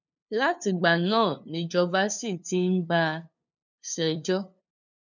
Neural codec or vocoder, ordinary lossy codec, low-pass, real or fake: codec, 16 kHz, 2 kbps, FunCodec, trained on LibriTTS, 25 frames a second; none; 7.2 kHz; fake